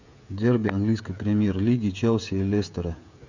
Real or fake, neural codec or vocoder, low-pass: fake; codec, 16 kHz, 16 kbps, FreqCodec, smaller model; 7.2 kHz